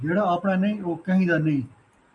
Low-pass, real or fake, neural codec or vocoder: 10.8 kHz; real; none